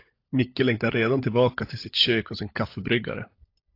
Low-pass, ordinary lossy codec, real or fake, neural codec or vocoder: 5.4 kHz; AAC, 32 kbps; fake; codec, 16 kHz, 16 kbps, FunCodec, trained on LibriTTS, 50 frames a second